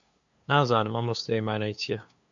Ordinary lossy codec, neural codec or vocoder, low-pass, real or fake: AAC, 64 kbps; codec, 16 kHz, 2 kbps, FunCodec, trained on LibriTTS, 25 frames a second; 7.2 kHz; fake